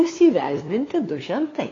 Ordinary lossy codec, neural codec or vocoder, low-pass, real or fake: AAC, 32 kbps; codec, 16 kHz, 2 kbps, FunCodec, trained on LibriTTS, 25 frames a second; 7.2 kHz; fake